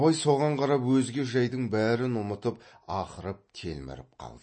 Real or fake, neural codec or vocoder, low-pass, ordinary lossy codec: real; none; 9.9 kHz; MP3, 32 kbps